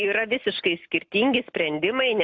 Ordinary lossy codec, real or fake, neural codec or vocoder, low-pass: AAC, 48 kbps; real; none; 7.2 kHz